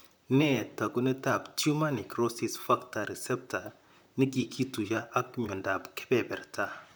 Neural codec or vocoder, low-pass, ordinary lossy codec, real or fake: vocoder, 44.1 kHz, 128 mel bands, Pupu-Vocoder; none; none; fake